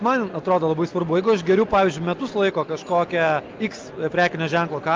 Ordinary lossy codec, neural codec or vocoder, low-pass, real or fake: Opus, 24 kbps; none; 7.2 kHz; real